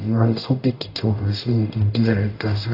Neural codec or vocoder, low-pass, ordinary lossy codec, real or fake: codec, 44.1 kHz, 0.9 kbps, DAC; 5.4 kHz; AAC, 32 kbps; fake